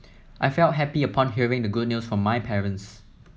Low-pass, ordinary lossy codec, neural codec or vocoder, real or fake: none; none; none; real